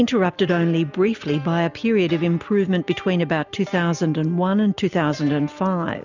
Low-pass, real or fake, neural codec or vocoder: 7.2 kHz; real; none